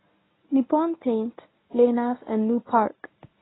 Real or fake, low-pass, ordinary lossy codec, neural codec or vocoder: fake; 7.2 kHz; AAC, 16 kbps; codec, 24 kHz, 0.9 kbps, WavTokenizer, medium speech release version 1